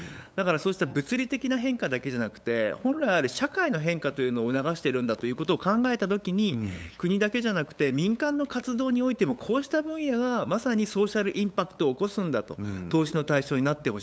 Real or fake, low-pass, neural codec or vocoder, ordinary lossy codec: fake; none; codec, 16 kHz, 8 kbps, FunCodec, trained on LibriTTS, 25 frames a second; none